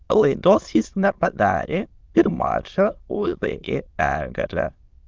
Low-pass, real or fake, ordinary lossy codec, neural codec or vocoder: 7.2 kHz; fake; Opus, 24 kbps; autoencoder, 22.05 kHz, a latent of 192 numbers a frame, VITS, trained on many speakers